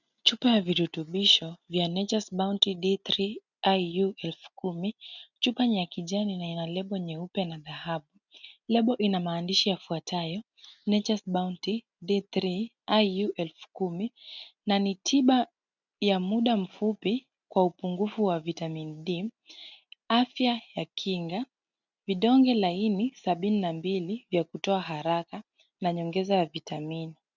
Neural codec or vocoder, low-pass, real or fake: none; 7.2 kHz; real